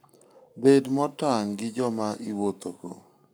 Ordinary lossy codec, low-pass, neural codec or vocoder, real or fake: none; none; codec, 44.1 kHz, 7.8 kbps, Pupu-Codec; fake